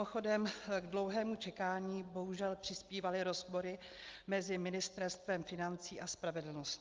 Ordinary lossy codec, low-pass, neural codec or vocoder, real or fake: Opus, 32 kbps; 7.2 kHz; none; real